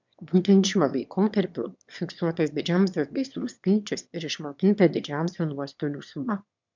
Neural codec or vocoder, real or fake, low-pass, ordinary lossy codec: autoencoder, 22.05 kHz, a latent of 192 numbers a frame, VITS, trained on one speaker; fake; 7.2 kHz; MP3, 64 kbps